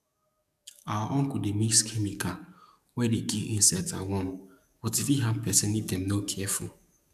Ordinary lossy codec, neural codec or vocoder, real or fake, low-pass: none; codec, 44.1 kHz, 7.8 kbps, DAC; fake; 14.4 kHz